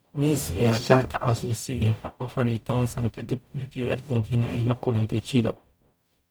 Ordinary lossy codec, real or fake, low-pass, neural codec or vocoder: none; fake; none; codec, 44.1 kHz, 0.9 kbps, DAC